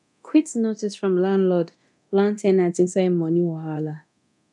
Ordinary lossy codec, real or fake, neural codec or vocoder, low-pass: none; fake; codec, 24 kHz, 0.9 kbps, DualCodec; 10.8 kHz